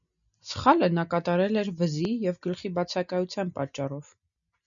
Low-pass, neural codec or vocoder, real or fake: 7.2 kHz; none; real